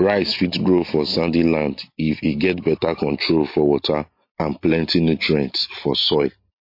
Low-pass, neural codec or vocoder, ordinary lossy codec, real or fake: 5.4 kHz; none; MP3, 32 kbps; real